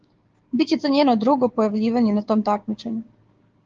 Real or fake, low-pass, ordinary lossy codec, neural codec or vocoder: fake; 7.2 kHz; Opus, 16 kbps; codec, 16 kHz, 6 kbps, DAC